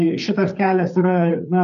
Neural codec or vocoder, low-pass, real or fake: codec, 16 kHz, 4 kbps, FreqCodec, larger model; 7.2 kHz; fake